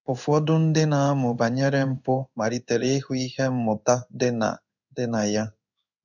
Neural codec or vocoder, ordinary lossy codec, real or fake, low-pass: codec, 16 kHz in and 24 kHz out, 1 kbps, XY-Tokenizer; none; fake; 7.2 kHz